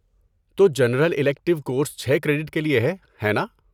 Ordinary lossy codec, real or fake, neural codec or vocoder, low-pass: none; real; none; 19.8 kHz